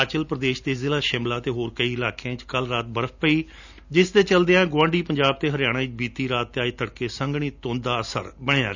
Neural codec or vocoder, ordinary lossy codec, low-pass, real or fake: none; none; 7.2 kHz; real